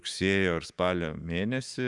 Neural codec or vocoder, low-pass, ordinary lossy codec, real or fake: autoencoder, 48 kHz, 128 numbers a frame, DAC-VAE, trained on Japanese speech; 10.8 kHz; Opus, 64 kbps; fake